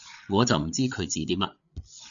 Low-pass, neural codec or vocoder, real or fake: 7.2 kHz; codec, 16 kHz, 8 kbps, FreqCodec, larger model; fake